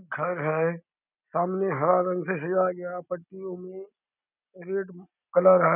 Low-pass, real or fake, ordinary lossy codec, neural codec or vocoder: 3.6 kHz; real; none; none